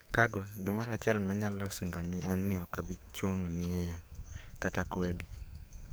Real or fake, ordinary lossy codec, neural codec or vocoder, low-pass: fake; none; codec, 44.1 kHz, 2.6 kbps, SNAC; none